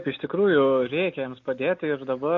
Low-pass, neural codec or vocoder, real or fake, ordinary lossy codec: 7.2 kHz; none; real; MP3, 48 kbps